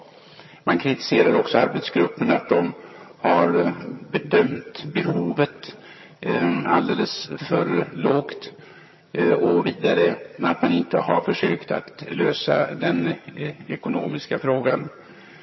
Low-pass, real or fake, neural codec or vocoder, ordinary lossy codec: 7.2 kHz; fake; vocoder, 22.05 kHz, 80 mel bands, HiFi-GAN; MP3, 24 kbps